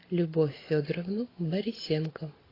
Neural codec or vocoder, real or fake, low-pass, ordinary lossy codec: none; real; 5.4 kHz; AAC, 24 kbps